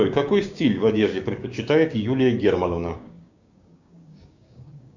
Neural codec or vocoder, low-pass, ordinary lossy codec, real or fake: codec, 16 kHz, 6 kbps, DAC; 7.2 kHz; Opus, 64 kbps; fake